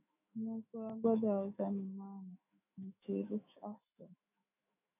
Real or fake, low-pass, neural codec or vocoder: fake; 3.6 kHz; autoencoder, 48 kHz, 128 numbers a frame, DAC-VAE, trained on Japanese speech